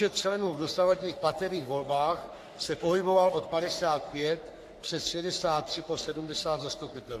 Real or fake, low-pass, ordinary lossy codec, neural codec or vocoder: fake; 14.4 kHz; AAC, 64 kbps; codec, 44.1 kHz, 3.4 kbps, Pupu-Codec